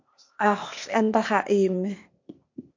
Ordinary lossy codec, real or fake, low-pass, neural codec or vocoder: MP3, 48 kbps; fake; 7.2 kHz; codec, 16 kHz, 0.8 kbps, ZipCodec